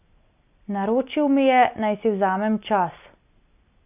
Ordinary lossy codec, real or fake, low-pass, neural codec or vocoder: none; real; 3.6 kHz; none